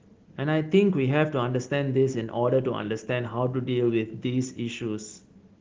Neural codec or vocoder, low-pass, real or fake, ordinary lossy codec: none; 7.2 kHz; real; Opus, 16 kbps